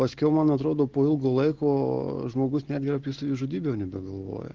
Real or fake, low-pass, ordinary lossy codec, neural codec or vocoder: real; 7.2 kHz; Opus, 16 kbps; none